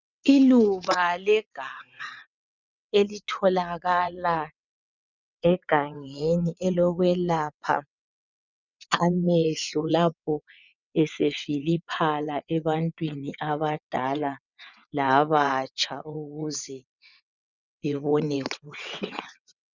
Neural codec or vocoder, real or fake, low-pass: vocoder, 22.05 kHz, 80 mel bands, WaveNeXt; fake; 7.2 kHz